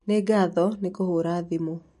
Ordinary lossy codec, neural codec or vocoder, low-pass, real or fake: MP3, 48 kbps; none; 14.4 kHz; real